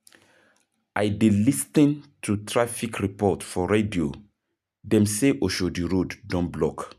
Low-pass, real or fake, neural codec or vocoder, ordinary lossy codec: 14.4 kHz; real; none; none